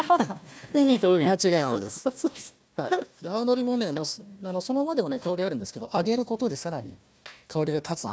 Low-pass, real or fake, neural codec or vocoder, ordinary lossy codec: none; fake; codec, 16 kHz, 1 kbps, FunCodec, trained on Chinese and English, 50 frames a second; none